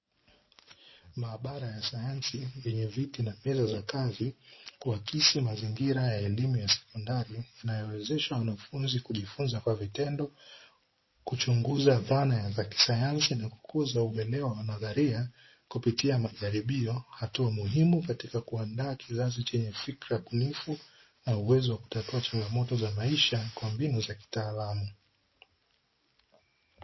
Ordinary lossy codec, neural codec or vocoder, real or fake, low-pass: MP3, 24 kbps; none; real; 7.2 kHz